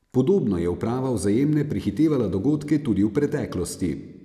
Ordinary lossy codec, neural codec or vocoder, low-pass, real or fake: none; none; 14.4 kHz; real